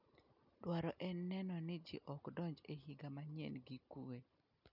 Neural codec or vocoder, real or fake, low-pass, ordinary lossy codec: none; real; 5.4 kHz; none